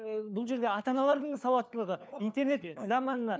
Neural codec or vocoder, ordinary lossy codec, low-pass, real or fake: codec, 16 kHz, 4 kbps, FunCodec, trained on LibriTTS, 50 frames a second; none; none; fake